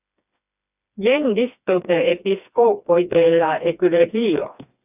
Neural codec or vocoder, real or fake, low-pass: codec, 16 kHz, 2 kbps, FreqCodec, smaller model; fake; 3.6 kHz